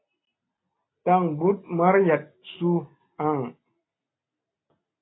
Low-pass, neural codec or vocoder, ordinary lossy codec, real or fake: 7.2 kHz; none; AAC, 16 kbps; real